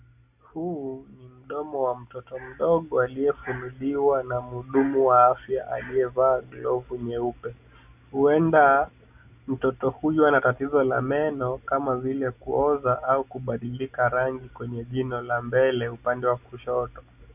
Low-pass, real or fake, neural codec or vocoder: 3.6 kHz; real; none